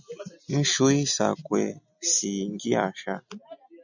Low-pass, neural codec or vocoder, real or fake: 7.2 kHz; none; real